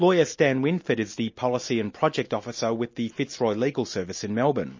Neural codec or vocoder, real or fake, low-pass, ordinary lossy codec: none; real; 7.2 kHz; MP3, 32 kbps